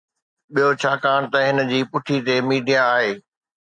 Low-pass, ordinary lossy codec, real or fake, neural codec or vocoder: 9.9 kHz; MP3, 96 kbps; real; none